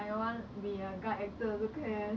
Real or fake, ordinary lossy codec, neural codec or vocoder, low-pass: real; none; none; none